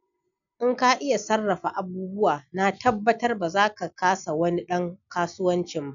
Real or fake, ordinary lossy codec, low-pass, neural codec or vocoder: real; none; 7.2 kHz; none